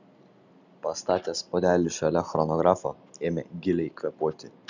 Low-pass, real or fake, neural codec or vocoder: 7.2 kHz; real; none